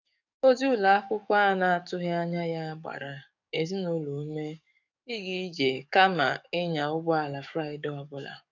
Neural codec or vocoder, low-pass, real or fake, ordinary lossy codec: codec, 44.1 kHz, 7.8 kbps, DAC; 7.2 kHz; fake; none